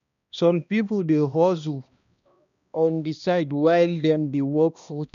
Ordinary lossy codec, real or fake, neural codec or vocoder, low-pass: none; fake; codec, 16 kHz, 1 kbps, X-Codec, HuBERT features, trained on balanced general audio; 7.2 kHz